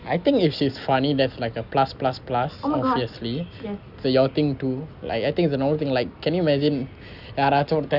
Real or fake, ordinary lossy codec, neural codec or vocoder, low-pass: real; none; none; 5.4 kHz